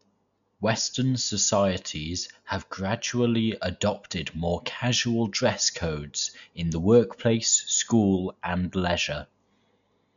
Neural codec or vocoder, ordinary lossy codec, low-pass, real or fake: none; none; 7.2 kHz; real